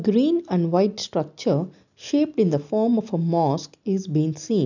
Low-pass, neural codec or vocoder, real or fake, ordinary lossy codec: 7.2 kHz; none; real; none